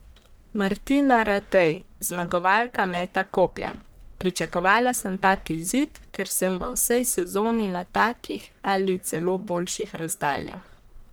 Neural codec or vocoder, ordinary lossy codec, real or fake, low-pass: codec, 44.1 kHz, 1.7 kbps, Pupu-Codec; none; fake; none